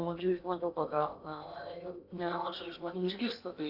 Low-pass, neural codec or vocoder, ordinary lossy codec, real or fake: 5.4 kHz; codec, 16 kHz in and 24 kHz out, 0.8 kbps, FocalCodec, streaming, 65536 codes; Opus, 64 kbps; fake